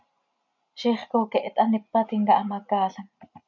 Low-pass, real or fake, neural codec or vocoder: 7.2 kHz; real; none